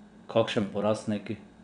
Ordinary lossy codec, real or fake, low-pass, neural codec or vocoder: none; fake; 9.9 kHz; vocoder, 22.05 kHz, 80 mel bands, WaveNeXt